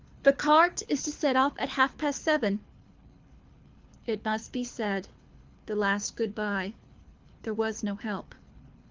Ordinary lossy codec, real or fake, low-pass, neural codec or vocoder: Opus, 32 kbps; fake; 7.2 kHz; codec, 24 kHz, 6 kbps, HILCodec